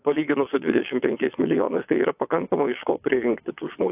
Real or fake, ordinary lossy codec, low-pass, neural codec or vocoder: fake; AAC, 32 kbps; 3.6 kHz; vocoder, 22.05 kHz, 80 mel bands, WaveNeXt